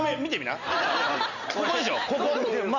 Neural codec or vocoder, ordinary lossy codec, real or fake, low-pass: none; none; real; 7.2 kHz